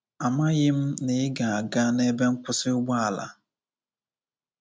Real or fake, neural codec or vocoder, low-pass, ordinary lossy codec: real; none; none; none